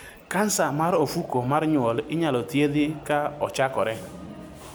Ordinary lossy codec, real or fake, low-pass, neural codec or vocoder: none; real; none; none